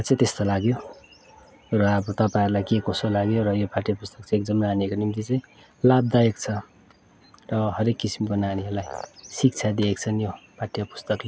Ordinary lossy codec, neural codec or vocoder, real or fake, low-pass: none; none; real; none